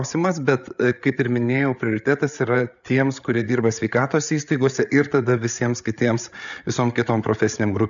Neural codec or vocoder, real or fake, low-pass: codec, 16 kHz, 8 kbps, FreqCodec, larger model; fake; 7.2 kHz